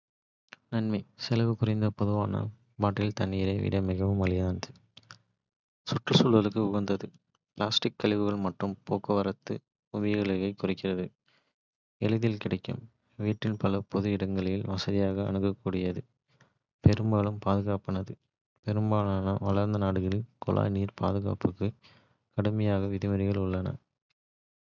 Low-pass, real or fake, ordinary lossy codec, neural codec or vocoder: 7.2 kHz; real; none; none